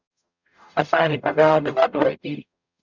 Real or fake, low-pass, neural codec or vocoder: fake; 7.2 kHz; codec, 44.1 kHz, 0.9 kbps, DAC